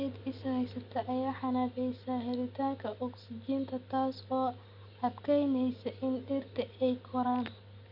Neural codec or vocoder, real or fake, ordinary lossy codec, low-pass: none; real; none; 5.4 kHz